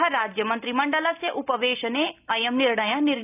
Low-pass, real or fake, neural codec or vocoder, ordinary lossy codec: 3.6 kHz; real; none; none